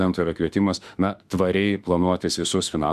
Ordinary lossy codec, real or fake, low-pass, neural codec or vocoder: Opus, 64 kbps; fake; 14.4 kHz; autoencoder, 48 kHz, 32 numbers a frame, DAC-VAE, trained on Japanese speech